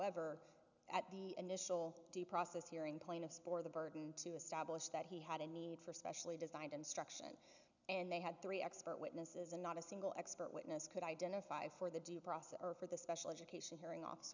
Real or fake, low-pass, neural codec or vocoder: real; 7.2 kHz; none